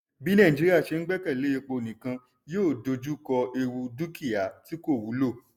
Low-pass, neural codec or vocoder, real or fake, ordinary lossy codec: 19.8 kHz; none; real; none